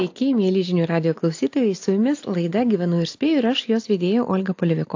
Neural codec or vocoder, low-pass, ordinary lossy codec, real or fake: none; 7.2 kHz; AAC, 48 kbps; real